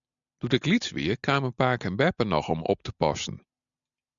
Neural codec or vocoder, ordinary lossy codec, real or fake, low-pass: none; MP3, 96 kbps; real; 7.2 kHz